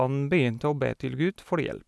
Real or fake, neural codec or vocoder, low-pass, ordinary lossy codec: real; none; none; none